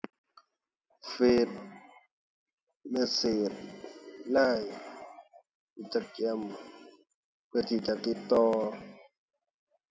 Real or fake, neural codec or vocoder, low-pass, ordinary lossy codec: real; none; none; none